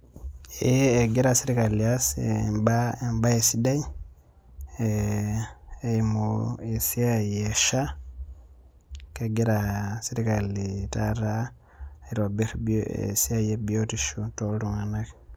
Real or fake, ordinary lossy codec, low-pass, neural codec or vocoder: real; none; none; none